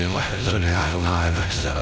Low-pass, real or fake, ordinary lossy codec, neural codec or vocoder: none; fake; none; codec, 16 kHz, 0.5 kbps, X-Codec, WavLM features, trained on Multilingual LibriSpeech